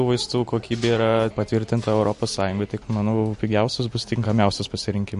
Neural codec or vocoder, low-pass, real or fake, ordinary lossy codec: none; 10.8 kHz; real; MP3, 48 kbps